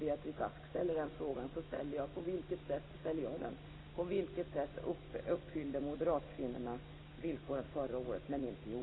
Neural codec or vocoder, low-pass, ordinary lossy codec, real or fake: none; 7.2 kHz; AAC, 16 kbps; real